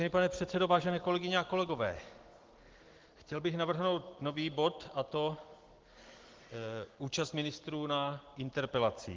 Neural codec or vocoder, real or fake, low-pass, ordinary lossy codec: none; real; 7.2 kHz; Opus, 16 kbps